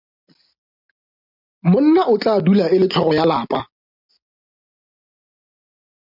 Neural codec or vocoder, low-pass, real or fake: none; 5.4 kHz; real